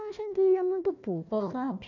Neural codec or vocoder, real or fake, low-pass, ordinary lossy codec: codec, 16 kHz, 1 kbps, FunCodec, trained on Chinese and English, 50 frames a second; fake; 7.2 kHz; none